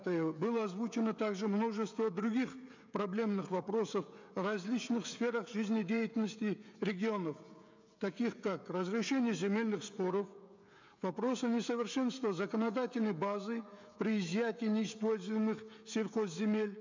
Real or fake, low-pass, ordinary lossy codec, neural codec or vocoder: fake; 7.2 kHz; MP3, 64 kbps; codec, 16 kHz, 16 kbps, FreqCodec, smaller model